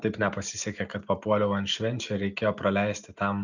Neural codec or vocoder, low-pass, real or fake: none; 7.2 kHz; real